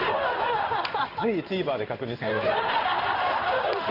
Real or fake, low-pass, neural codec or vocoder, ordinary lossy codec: fake; 5.4 kHz; codec, 16 kHz in and 24 kHz out, 1 kbps, XY-Tokenizer; none